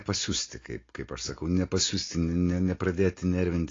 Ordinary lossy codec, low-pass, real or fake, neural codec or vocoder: AAC, 32 kbps; 7.2 kHz; real; none